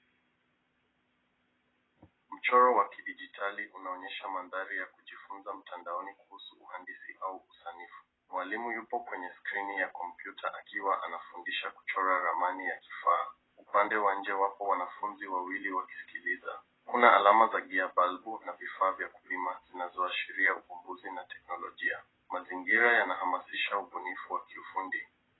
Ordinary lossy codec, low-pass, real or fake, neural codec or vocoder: AAC, 16 kbps; 7.2 kHz; real; none